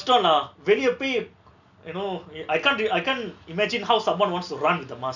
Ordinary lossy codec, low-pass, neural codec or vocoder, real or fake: none; 7.2 kHz; none; real